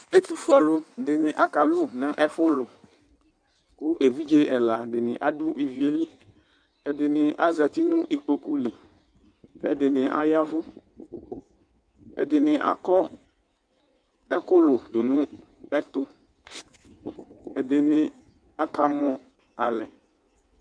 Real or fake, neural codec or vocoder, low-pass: fake; codec, 16 kHz in and 24 kHz out, 1.1 kbps, FireRedTTS-2 codec; 9.9 kHz